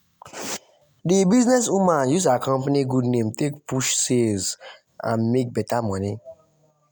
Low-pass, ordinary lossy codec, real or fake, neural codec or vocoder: none; none; real; none